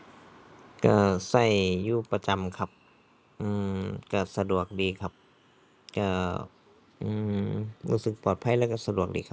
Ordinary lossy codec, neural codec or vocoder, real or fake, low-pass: none; none; real; none